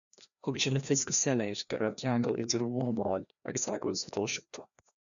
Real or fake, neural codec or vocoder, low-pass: fake; codec, 16 kHz, 1 kbps, FreqCodec, larger model; 7.2 kHz